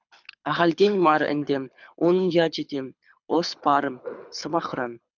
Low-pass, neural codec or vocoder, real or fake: 7.2 kHz; codec, 24 kHz, 6 kbps, HILCodec; fake